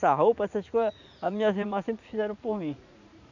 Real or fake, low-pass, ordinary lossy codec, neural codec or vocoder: fake; 7.2 kHz; none; vocoder, 44.1 kHz, 80 mel bands, Vocos